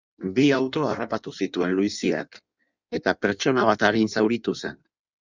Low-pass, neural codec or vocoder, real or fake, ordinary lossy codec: 7.2 kHz; codec, 16 kHz in and 24 kHz out, 1.1 kbps, FireRedTTS-2 codec; fake; Opus, 64 kbps